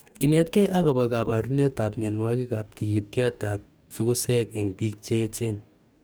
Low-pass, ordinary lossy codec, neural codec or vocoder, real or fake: none; none; codec, 44.1 kHz, 2.6 kbps, DAC; fake